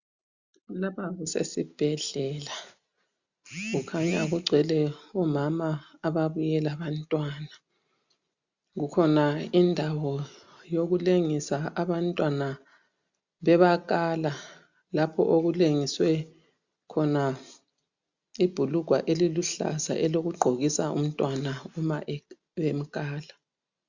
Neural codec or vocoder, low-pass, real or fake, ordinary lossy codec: none; 7.2 kHz; real; Opus, 64 kbps